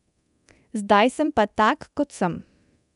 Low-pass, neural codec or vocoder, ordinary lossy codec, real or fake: 10.8 kHz; codec, 24 kHz, 0.9 kbps, DualCodec; none; fake